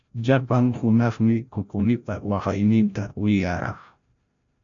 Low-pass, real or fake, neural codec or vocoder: 7.2 kHz; fake; codec, 16 kHz, 0.5 kbps, FreqCodec, larger model